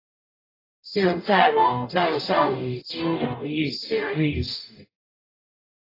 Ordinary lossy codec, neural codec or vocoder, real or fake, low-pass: AAC, 24 kbps; codec, 44.1 kHz, 0.9 kbps, DAC; fake; 5.4 kHz